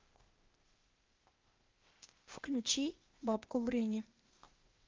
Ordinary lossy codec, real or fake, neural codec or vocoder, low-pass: Opus, 32 kbps; fake; codec, 16 kHz, 0.8 kbps, ZipCodec; 7.2 kHz